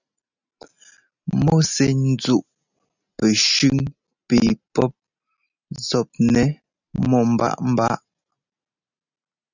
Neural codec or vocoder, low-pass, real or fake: none; 7.2 kHz; real